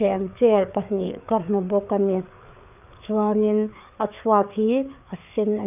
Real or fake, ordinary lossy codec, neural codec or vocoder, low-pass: fake; none; codec, 16 kHz, 4 kbps, FreqCodec, larger model; 3.6 kHz